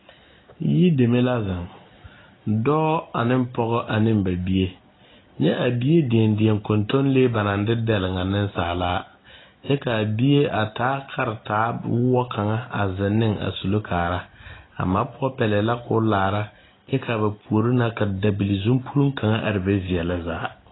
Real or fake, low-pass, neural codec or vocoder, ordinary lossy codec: real; 7.2 kHz; none; AAC, 16 kbps